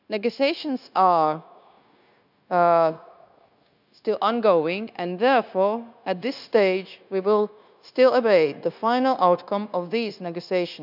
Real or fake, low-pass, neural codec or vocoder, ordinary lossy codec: fake; 5.4 kHz; codec, 16 kHz, 0.9 kbps, LongCat-Audio-Codec; none